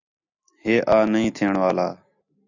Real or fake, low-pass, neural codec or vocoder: real; 7.2 kHz; none